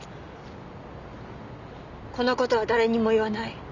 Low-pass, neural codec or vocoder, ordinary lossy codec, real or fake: 7.2 kHz; none; none; real